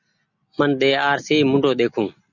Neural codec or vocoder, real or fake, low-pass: none; real; 7.2 kHz